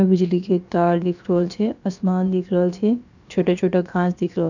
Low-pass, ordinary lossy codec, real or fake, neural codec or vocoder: 7.2 kHz; none; fake; codec, 16 kHz, about 1 kbps, DyCAST, with the encoder's durations